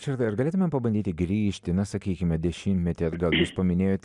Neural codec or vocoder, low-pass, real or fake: none; 10.8 kHz; real